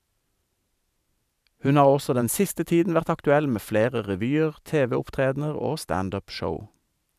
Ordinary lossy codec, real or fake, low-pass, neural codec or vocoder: none; fake; 14.4 kHz; vocoder, 44.1 kHz, 128 mel bands every 512 samples, BigVGAN v2